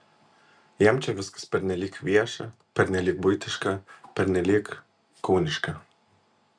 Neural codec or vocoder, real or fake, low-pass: none; real; 9.9 kHz